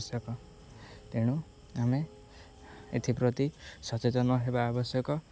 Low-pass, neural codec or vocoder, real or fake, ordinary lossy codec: none; none; real; none